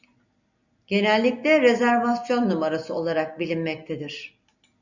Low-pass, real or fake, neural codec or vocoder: 7.2 kHz; real; none